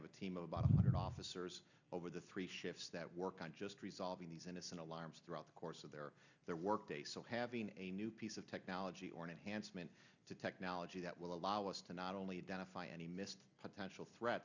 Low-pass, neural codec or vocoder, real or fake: 7.2 kHz; none; real